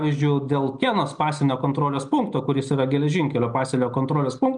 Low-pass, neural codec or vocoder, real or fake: 9.9 kHz; none; real